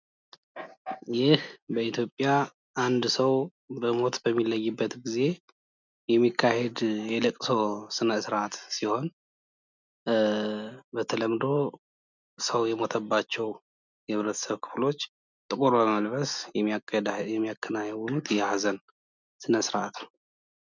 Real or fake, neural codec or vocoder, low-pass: real; none; 7.2 kHz